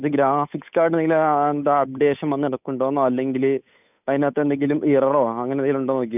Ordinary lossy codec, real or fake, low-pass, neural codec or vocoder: none; fake; 3.6 kHz; codec, 16 kHz, 8 kbps, FunCodec, trained on Chinese and English, 25 frames a second